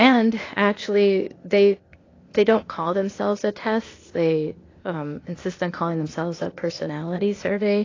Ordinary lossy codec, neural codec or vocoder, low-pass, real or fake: AAC, 32 kbps; codec, 16 kHz, 0.8 kbps, ZipCodec; 7.2 kHz; fake